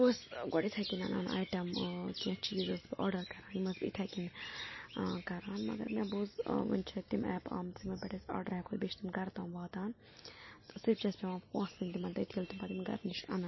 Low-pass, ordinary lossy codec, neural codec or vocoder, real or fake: 7.2 kHz; MP3, 24 kbps; none; real